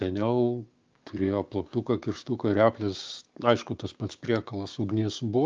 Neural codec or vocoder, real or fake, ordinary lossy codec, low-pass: codec, 16 kHz, 6 kbps, DAC; fake; Opus, 24 kbps; 7.2 kHz